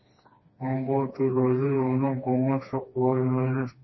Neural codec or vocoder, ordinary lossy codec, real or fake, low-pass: codec, 16 kHz, 2 kbps, FreqCodec, smaller model; MP3, 24 kbps; fake; 7.2 kHz